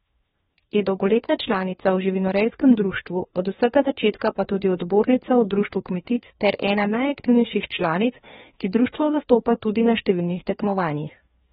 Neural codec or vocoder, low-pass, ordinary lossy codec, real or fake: codec, 16 kHz, 2 kbps, FreqCodec, larger model; 7.2 kHz; AAC, 16 kbps; fake